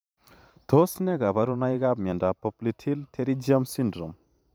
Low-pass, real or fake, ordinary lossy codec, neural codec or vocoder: none; fake; none; vocoder, 44.1 kHz, 128 mel bands every 512 samples, BigVGAN v2